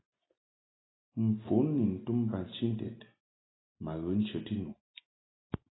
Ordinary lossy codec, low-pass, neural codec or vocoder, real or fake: AAC, 16 kbps; 7.2 kHz; none; real